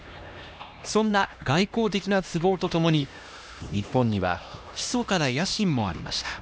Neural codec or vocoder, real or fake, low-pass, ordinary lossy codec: codec, 16 kHz, 1 kbps, X-Codec, HuBERT features, trained on LibriSpeech; fake; none; none